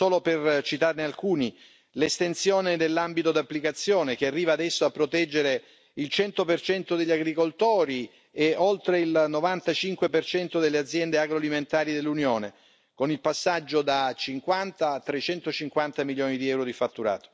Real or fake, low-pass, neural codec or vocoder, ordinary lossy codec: real; none; none; none